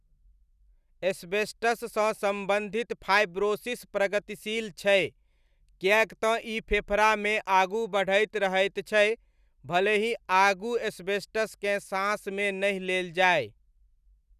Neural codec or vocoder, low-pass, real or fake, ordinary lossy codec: none; 14.4 kHz; real; none